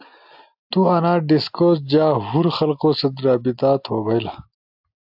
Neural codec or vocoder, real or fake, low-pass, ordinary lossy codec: none; real; 5.4 kHz; AAC, 48 kbps